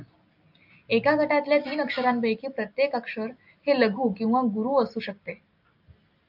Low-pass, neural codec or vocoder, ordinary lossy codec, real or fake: 5.4 kHz; none; AAC, 48 kbps; real